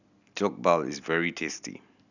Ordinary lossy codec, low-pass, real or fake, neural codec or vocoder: none; 7.2 kHz; real; none